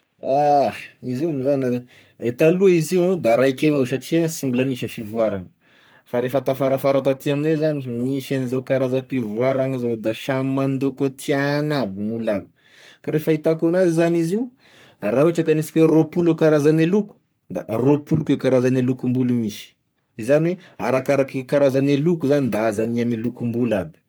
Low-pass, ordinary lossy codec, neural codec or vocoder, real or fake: none; none; codec, 44.1 kHz, 3.4 kbps, Pupu-Codec; fake